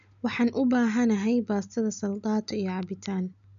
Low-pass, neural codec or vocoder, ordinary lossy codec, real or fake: 7.2 kHz; none; none; real